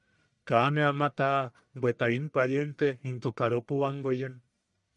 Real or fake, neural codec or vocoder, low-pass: fake; codec, 44.1 kHz, 1.7 kbps, Pupu-Codec; 10.8 kHz